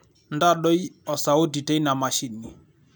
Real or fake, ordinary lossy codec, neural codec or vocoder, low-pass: real; none; none; none